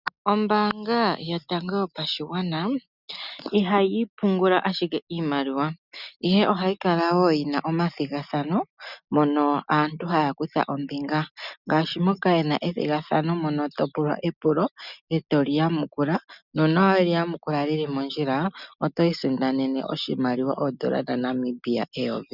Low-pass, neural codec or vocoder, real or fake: 5.4 kHz; none; real